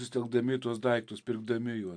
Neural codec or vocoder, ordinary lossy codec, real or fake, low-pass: none; MP3, 64 kbps; real; 9.9 kHz